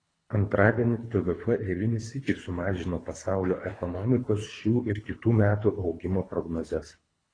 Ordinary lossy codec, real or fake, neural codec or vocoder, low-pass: AAC, 32 kbps; fake; codec, 24 kHz, 3 kbps, HILCodec; 9.9 kHz